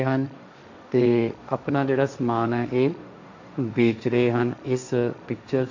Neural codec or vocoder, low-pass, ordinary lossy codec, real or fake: codec, 16 kHz, 1.1 kbps, Voila-Tokenizer; 7.2 kHz; none; fake